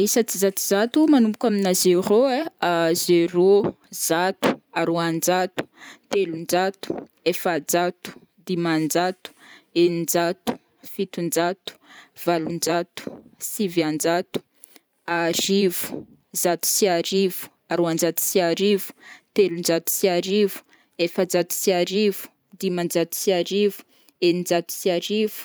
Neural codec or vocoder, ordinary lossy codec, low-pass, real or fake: vocoder, 44.1 kHz, 128 mel bands every 512 samples, BigVGAN v2; none; none; fake